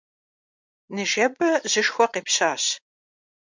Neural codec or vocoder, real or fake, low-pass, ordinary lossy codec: none; real; 7.2 kHz; MP3, 64 kbps